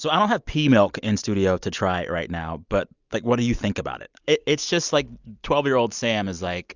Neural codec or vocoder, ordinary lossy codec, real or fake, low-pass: none; Opus, 64 kbps; real; 7.2 kHz